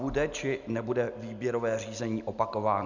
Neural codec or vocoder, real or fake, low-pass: none; real; 7.2 kHz